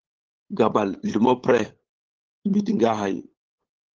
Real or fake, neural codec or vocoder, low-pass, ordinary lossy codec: fake; codec, 16 kHz, 8 kbps, FunCodec, trained on LibriTTS, 25 frames a second; 7.2 kHz; Opus, 16 kbps